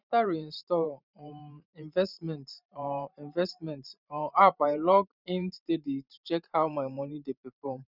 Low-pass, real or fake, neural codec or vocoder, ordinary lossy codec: 5.4 kHz; fake; vocoder, 44.1 kHz, 128 mel bands every 512 samples, BigVGAN v2; none